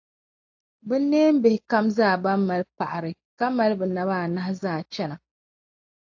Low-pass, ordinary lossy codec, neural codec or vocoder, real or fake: 7.2 kHz; AAC, 48 kbps; none; real